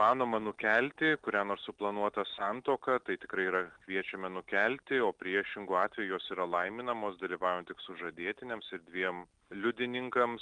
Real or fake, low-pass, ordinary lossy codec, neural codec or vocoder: real; 9.9 kHz; Opus, 24 kbps; none